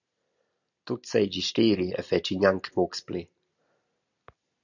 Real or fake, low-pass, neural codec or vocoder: real; 7.2 kHz; none